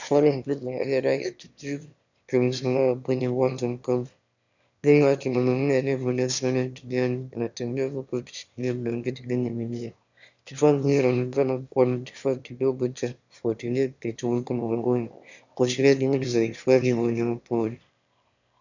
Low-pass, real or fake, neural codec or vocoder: 7.2 kHz; fake; autoencoder, 22.05 kHz, a latent of 192 numbers a frame, VITS, trained on one speaker